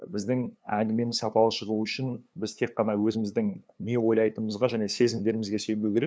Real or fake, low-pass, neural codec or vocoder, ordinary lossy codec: fake; none; codec, 16 kHz, 2 kbps, FunCodec, trained on LibriTTS, 25 frames a second; none